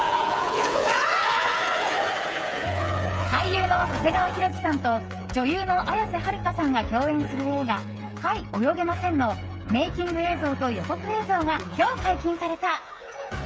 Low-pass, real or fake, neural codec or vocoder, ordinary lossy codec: none; fake; codec, 16 kHz, 8 kbps, FreqCodec, smaller model; none